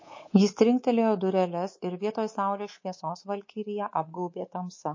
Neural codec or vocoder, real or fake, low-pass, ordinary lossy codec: codec, 24 kHz, 3.1 kbps, DualCodec; fake; 7.2 kHz; MP3, 32 kbps